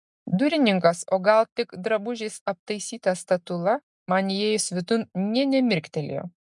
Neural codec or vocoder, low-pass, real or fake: none; 10.8 kHz; real